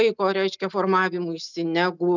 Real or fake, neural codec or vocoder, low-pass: real; none; 7.2 kHz